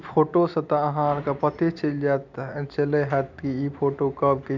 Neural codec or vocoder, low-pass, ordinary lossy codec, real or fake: none; 7.2 kHz; none; real